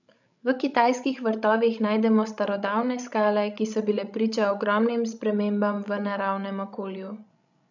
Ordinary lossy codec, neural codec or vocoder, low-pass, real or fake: none; codec, 16 kHz, 16 kbps, FreqCodec, larger model; 7.2 kHz; fake